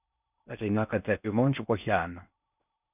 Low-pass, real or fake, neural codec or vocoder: 3.6 kHz; fake; codec, 16 kHz in and 24 kHz out, 0.6 kbps, FocalCodec, streaming, 4096 codes